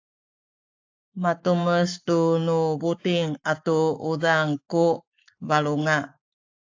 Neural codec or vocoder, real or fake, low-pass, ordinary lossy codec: codec, 44.1 kHz, 7.8 kbps, Pupu-Codec; fake; 7.2 kHz; AAC, 48 kbps